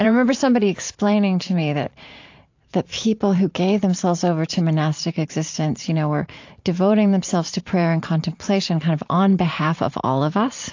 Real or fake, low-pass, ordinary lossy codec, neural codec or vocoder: fake; 7.2 kHz; AAC, 48 kbps; vocoder, 44.1 kHz, 128 mel bands every 512 samples, BigVGAN v2